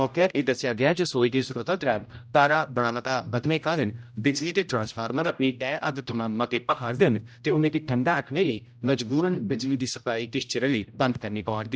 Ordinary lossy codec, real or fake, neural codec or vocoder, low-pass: none; fake; codec, 16 kHz, 0.5 kbps, X-Codec, HuBERT features, trained on general audio; none